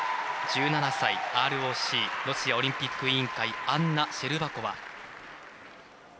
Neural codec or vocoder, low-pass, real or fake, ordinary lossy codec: none; none; real; none